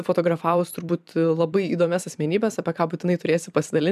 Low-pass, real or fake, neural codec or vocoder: 14.4 kHz; fake; vocoder, 44.1 kHz, 128 mel bands every 256 samples, BigVGAN v2